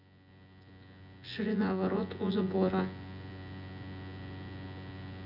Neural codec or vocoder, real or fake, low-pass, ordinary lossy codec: vocoder, 24 kHz, 100 mel bands, Vocos; fake; 5.4 kHz; none